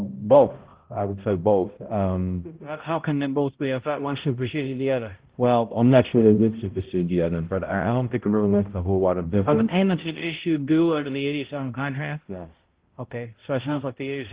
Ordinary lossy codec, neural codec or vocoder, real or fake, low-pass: Opus, 16 kbps; codec, 16 kHz, 0.5 kbps, X-Codec, HuBERT features, trained on balanced general audio; fake; 3.6 kHz